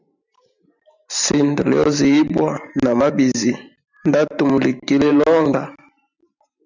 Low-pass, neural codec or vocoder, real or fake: 7.2 kHz; vocoder, 44.1 kHz, 128 mel bands every 512 samples, BigVGAN v2; fake